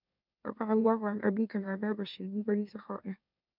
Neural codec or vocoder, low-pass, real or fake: autoencoder, 44.1 kHz, a latent of 192 numbers a frame, MeloTTS; 5.4 kHz; fake